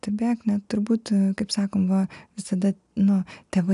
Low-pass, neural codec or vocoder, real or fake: 10.8 kHz; none; real